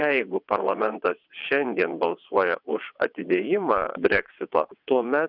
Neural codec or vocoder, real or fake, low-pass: vocoder, 22.05 kHz, 80 mel bands, WaveNeXt; fake; 5.4 kHz